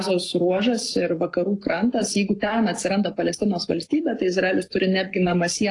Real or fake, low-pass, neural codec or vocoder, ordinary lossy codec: fake; 10.8 kHz; codec, 44.1 kHz, 7.8 kbps, Pupu-Codec; AAC, 48 kbps